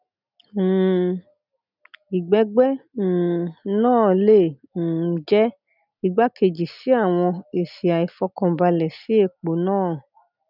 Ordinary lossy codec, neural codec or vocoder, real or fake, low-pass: none; none; real; 5.4 kHz